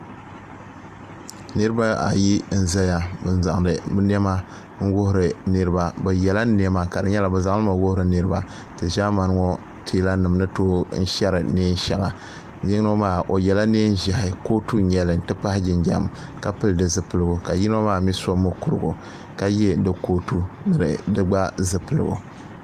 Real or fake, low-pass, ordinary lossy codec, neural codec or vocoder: real; 14.4 kHz; Opus, 32 kbps; none